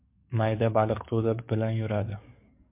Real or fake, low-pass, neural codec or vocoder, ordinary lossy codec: fake; 3.6 kHz; codec, 44.1 kHz, 7.8 kbps, DAC; MP3, 32 kbps